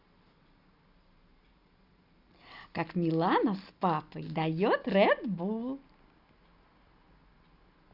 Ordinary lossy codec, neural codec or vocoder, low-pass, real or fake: Opus, 64 kbps; none; 5.4 kHz; real